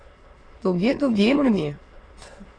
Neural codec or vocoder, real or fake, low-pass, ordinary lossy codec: autoencoder, 22.05 kHz, a latent of 192 numbers a frame, VITS, trained on many speakers; fake; 9.9 kHz; AAC, 32 kbps